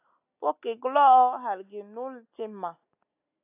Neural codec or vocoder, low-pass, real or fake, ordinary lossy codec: none; 3.6 kHz; real; AAC, 32 kbps